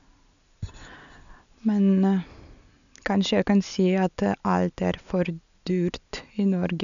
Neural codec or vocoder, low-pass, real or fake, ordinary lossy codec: none; 7.2 kHz; real; none